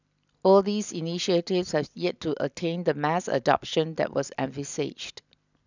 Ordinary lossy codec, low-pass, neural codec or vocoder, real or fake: none; 7.2 kHz; none; real